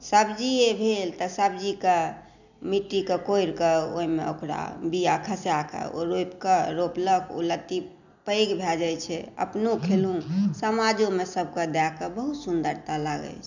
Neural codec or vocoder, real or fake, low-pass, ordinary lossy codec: none; real; 7.2 kHz; none